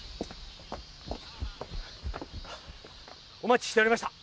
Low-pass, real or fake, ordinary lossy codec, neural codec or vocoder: none; real; none; none